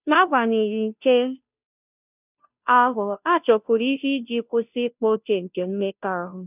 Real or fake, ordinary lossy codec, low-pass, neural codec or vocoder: fake; none; 3.6 kHz; codec, 16 kHz, 0.5 kbps, FunCodec, trained on Chinese and English, 25 frames a second